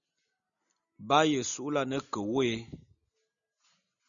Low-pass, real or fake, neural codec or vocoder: 7.2 kHz; real; none